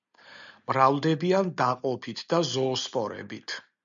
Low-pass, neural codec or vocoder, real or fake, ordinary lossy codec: 7.2 kHz; none; real; MP3, 96 kbps